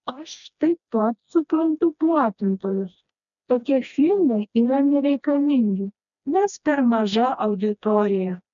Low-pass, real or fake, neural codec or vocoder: 7.2 kHz; fake; codec, 16 kHz, 1 kbps, FreqCodec, smaller model